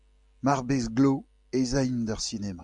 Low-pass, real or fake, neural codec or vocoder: 10.8 kHz; real; none